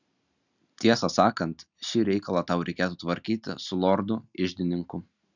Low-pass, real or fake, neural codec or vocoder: 7.2 kHz; real; none